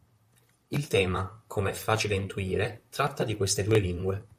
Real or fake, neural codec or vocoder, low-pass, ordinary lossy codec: fake; vocoder, 44.1 kHz, 128 mel bands, Pupu-Vocoder; 14.4 kHz; MP3, 96 kbps